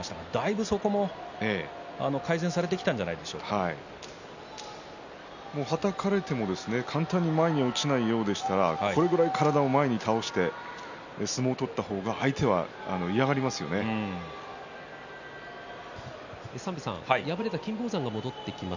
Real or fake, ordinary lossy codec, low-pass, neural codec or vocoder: real; none; 7.2 kHz; none